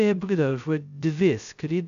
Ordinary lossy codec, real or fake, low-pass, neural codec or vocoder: MP3, 96 kbps; fake; 7.2 kHz; codec, 16 kHz, 0.2 kbps, FocalCodec